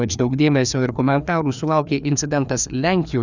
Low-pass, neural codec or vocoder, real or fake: 7.2 kHz; codec, 16 kHz, 2 kbps, FreqCodec, larger model; fake